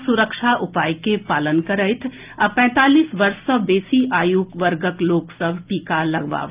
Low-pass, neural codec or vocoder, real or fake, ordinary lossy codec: 3.6 kHz; none; real; Opus, 24 kbps